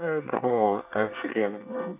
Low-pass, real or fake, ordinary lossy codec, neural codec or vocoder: 3.6 kHz; fake; none; codec, 24 kHz, 1 kbps, SNAC